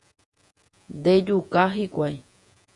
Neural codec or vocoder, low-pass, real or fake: vocoder, 48 kHz, 128 mel bands, Vocos; 10.8 kHz; fake